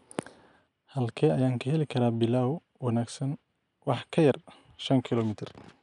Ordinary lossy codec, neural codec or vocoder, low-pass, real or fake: none; none; 10.8 kHz; real